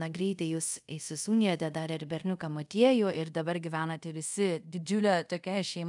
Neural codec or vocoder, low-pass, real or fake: codec, 24 kHz, 0.5 kbps, DualCodec; 10.8 kHz; fake